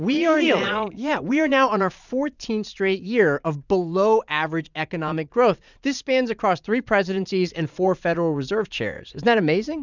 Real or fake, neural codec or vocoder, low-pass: fake; vocoder, 44.1 kHz, 80 mel bands, Vocos; 7.2 kHz